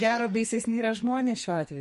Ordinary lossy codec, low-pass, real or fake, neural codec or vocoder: MP3, 48 kbps; 14.4 kHz; fake; codec, 44.1 kHz, 2.6 kbps, SNAC